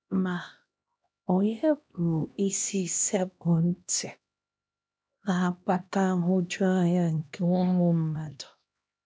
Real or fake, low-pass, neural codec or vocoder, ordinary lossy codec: fake; none; codec, 16 kHz, 1 kbps, X-Codec, HuBERT features, trained on LibriSpeech; none